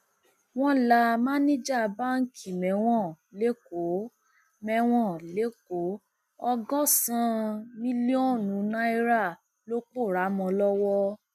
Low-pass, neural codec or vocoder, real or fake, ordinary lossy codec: 14.4 kHz; none; real; MP3, 96 kbps